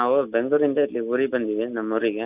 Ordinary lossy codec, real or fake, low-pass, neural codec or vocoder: none; real; 3.6 kHz; none